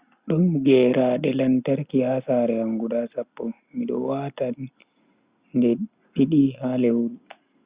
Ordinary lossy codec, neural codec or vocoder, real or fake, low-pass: Opus, 64 kbps; none; real; 3.6 kHz